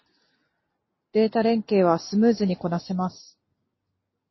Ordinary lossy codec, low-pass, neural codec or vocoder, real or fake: MP3, 24 kbps; 7.2 kHz; none; real